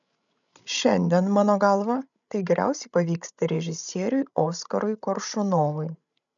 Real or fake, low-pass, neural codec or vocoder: fake; 7.2 kHz; codec, 16 kHz, 16 kbps, FreqCodec, larger model